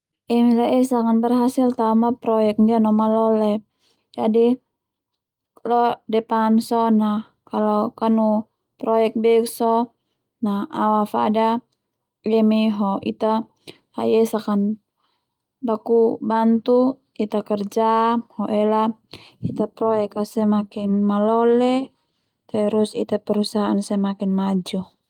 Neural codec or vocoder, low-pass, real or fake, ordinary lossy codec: none; 19.8 kHz; real; Opus, 32 kbps